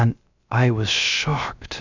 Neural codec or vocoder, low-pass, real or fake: codec, 16 kHz, 0.3 kbps, FocalCodec; 7.2 kHz; fake